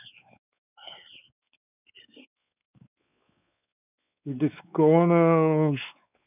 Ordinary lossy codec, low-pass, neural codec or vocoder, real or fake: none; 3.6 kHz; codec, 16 kHz, 4 kbps, X-Codec, WavLM features, trained on Multilingual LibriSpeech; fake